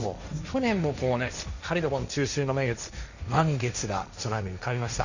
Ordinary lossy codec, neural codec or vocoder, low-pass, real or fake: none; codec, 16 kHz, 1.1 kbps, Voila-Tokenizer; 7.2 kHz; fake